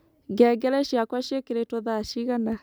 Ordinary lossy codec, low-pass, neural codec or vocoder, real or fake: none; none; none; real